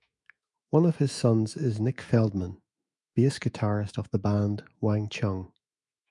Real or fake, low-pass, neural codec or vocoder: fake; 10.8 kHz; autoencoder, 48 kHz, 128 numbers a frame, DAC-VAE, trained on Japanese speech